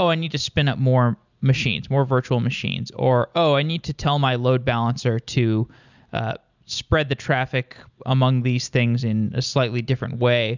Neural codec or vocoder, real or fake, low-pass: none; real; 7.2 kHz